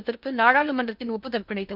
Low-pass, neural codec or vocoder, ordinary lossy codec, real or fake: 5.4 kHz; codec, 16 kHz in and 24 kHz out, 0.6 kbps, FocalCodec, streaming, 2048 codes; none; fake